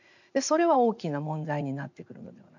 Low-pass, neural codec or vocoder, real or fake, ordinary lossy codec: 7.2 kHz; vocoder, 44.1 kHz, 80 mel bands, Vocos; fake; none